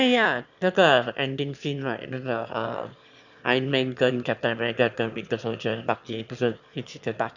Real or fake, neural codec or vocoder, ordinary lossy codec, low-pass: fake; autoencoder, 22.05 kHz, a latent of 192 numbers a frame, VITS, trained on one speaker; none; 7.2 kHz